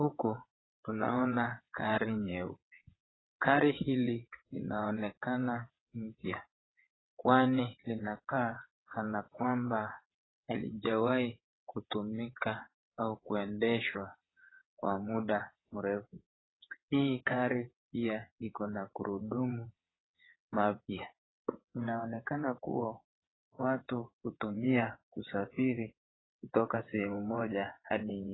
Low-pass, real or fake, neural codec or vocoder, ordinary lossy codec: 7.2 kHz; fake; vocoder, 22.05 kHz, 80 mel bands, WaveNeXt; AAC, 16 kbps